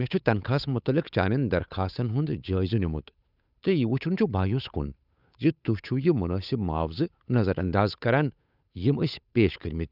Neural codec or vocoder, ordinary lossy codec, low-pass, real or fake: codec, 16 kHz, 8 kbps, FunCodec, trained on Chinese and English, 25 frames a second; none; 5.4 kHz; fake